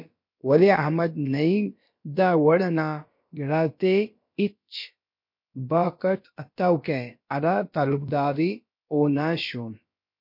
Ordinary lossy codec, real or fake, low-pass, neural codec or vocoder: MP3, 32 kbps; fake; 5.4 kHz; codec, 16 kHz, about 1 kbps, DyCAST, with the encoder's durations